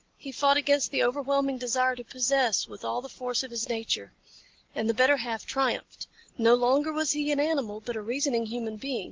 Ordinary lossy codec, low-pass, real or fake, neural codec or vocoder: Opus, 16 kbps; 7.2 kHz; real; none